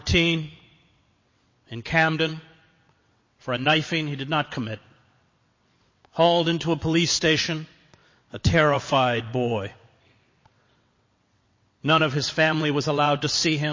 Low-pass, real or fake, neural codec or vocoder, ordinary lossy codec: 7.2 kHz; fake; vocoder, 22.05 kHz, 80 mel bands, WaveNeXt; MP3, 32 kbps